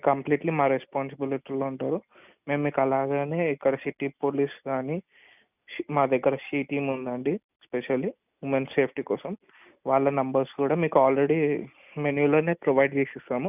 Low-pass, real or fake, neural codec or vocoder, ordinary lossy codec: 3.6 kHz; real; none; none